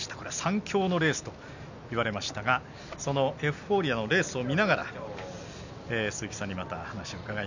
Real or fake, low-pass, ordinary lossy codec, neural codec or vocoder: real; 7.2 kHz; none; none